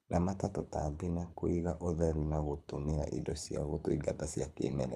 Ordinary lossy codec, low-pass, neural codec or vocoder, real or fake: none; none; codec, 24 kHz, 6 kbps, HILCodec; fake